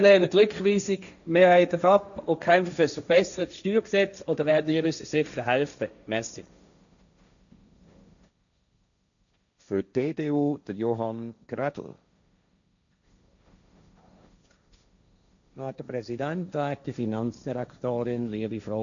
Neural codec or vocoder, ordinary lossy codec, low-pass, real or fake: codec, 16 kHz, 1.1 kbps, Voila-Tokenizer; none; 7.2 kHz; fake